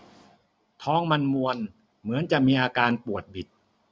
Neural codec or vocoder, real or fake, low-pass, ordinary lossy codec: none; real; none; none